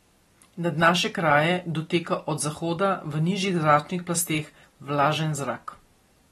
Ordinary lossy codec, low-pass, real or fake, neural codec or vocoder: AAC, 32 kbps; 19.8 kHz; real; none